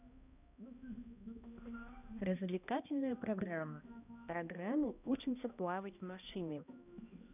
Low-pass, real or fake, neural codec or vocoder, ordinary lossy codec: 3.6 kHz; fake; codec, 16 kHz, 1 kbps, X-Codec, HuBERT features, trained on balanced general audio; none